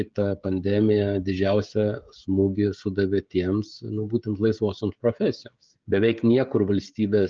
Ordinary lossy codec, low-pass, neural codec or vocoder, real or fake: Opus, 24 kbps; 7.2 kHz; codec, 16 kHz, 16 kbps, FreqCodec, smaller model; fake